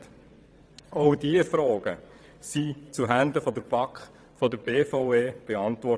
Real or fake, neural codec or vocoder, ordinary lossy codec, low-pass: fake; vocoder, 22.05 kHz, 80 mel bands, WaveNeXt; none; none